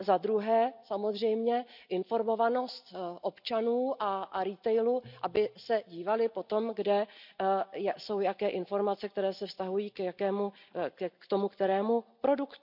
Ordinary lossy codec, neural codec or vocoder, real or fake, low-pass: none; none; real; 5.4 kHz